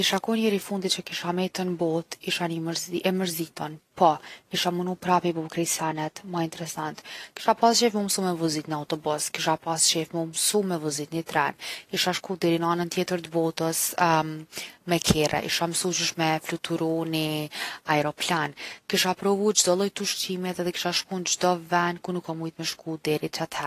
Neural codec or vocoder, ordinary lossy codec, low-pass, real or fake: none; AAC, 48 kbps; 14.4 kHz; real